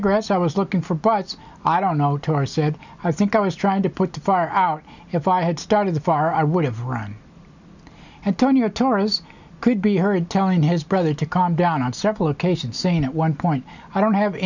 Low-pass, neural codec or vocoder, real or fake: 7.2 kHz; none; real